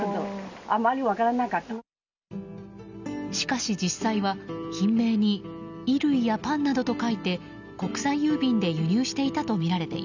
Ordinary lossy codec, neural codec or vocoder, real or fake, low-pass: none; none; real; 7.2 kHz